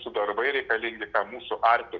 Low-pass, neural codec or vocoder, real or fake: 7.2 kHz; none; real